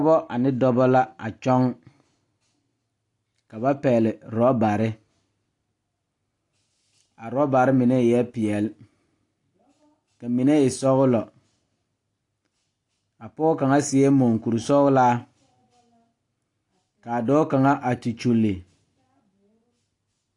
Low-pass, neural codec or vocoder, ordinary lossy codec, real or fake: 10.8 kHz; none; AAC, 48 kbps; real